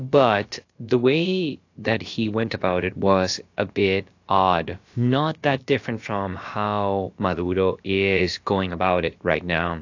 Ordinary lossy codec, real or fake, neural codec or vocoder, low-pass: AAC, 48 kbps; fake; codec, 16 kHz, about 1 kbps, DyCAST, with the encoder's durations; 7.2 kHz